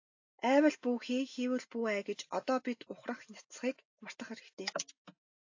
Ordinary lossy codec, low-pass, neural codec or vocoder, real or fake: AAC, 48 kbps; 7.2 kHz; none; real